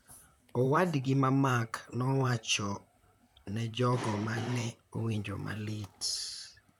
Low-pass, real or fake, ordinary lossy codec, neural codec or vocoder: 19.8 kHz; fake; none; vocoder, 44.1 kHz, 128 mel bands, Pupu-Vocoder